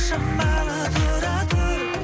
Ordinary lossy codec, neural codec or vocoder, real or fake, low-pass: none; none; real; none